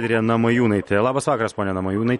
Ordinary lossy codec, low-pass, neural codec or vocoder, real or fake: MP3, 48 kbps; 19.8 kHz; none; real